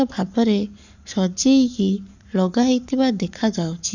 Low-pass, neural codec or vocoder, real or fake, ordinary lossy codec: 7.2 kHz; codec, 44.1 kHz, 7.8 kbps, Pupu-Codec; fake; none